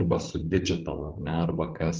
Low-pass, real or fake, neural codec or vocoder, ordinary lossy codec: 7.2 kHz; fake; codec, 16 kHz, 8 kbps, FreqCodec, larger model; Opus, 24 kbps